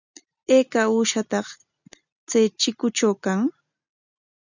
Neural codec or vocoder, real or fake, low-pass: none; real; 7.2 kHz